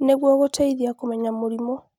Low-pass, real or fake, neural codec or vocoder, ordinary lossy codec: 19.8 kHz; real; none; none